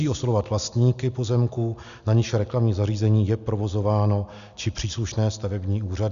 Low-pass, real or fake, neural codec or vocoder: 7.2 kHz; real; none